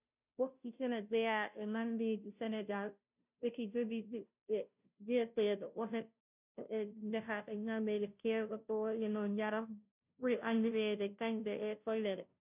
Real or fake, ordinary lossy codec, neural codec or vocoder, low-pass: fake; none; codec, 16 kHz, 0.5 kbps, FunCodec, trained on Chinese and English, 25 frames a second; 3.6 kHz